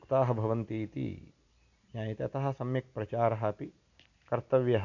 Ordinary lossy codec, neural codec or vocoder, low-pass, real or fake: none; none; 7.2 kHz; real